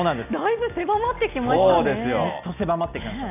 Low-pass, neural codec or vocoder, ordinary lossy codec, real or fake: 3.6 kHz; none; none; real